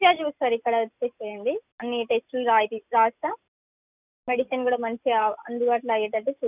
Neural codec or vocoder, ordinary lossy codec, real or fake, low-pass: none; none; real; 3.6 kHz